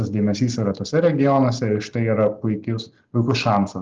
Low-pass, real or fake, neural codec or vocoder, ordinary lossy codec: 7.2 kHz; real; none; Opus, 24 kbps